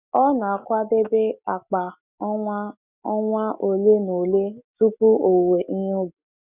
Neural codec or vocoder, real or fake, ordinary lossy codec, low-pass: none; real; none; 3.6 kHz